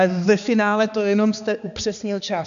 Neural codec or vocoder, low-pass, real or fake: codec, 16 kHz, 2 kbps, X-Codec, HuBERT features, trained on balanced general audio; 7.2 kHz; fake